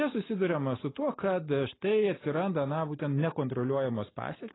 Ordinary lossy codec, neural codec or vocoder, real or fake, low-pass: AAC, 16 kbps; none; real; 7.2 kHz